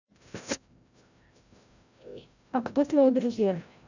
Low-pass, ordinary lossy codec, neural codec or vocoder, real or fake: 7.2 kHz; none; codec, 16 kHz, 0.5 kbps, FreqCodec, larger model; fake